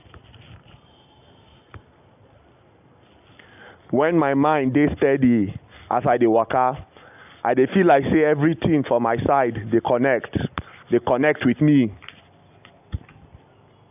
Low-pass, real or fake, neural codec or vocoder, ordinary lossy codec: 3.6 kHz; real; none; none